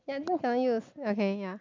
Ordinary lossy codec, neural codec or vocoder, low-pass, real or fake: MP3, 64 kbps; none; 7.2 kHz; real